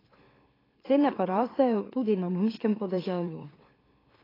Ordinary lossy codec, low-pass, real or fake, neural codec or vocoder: AAC, 24 kbps; 5.4 kHz; fake; autoencoder, 44.1 kHz, a latent of 192 numbers a frame, MeloTTS